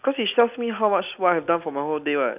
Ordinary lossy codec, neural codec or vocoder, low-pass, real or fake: none; none; 3.6 kHz; real